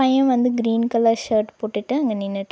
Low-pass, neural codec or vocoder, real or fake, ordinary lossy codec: none; none; real; none